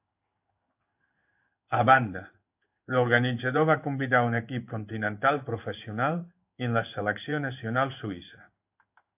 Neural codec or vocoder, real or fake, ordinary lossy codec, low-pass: codec, 16 kHz in and 24 kHz out, 1 kbps, XY-Tokenizer; fake; AAC, 32 kbps; 3.6 kHz